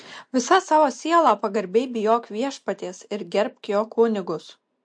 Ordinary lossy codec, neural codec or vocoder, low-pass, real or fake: MP3, 48 kbps; none; 9.9 kHz; real